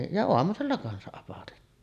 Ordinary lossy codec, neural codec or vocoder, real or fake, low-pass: none; none; real; 14.4 kHz